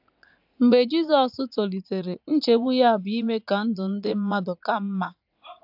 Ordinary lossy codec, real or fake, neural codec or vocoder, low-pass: AAC, 48 kbps; fake; vocoder, 44.1 kHz, 80 mel bands, Vocos; 5.4 kHz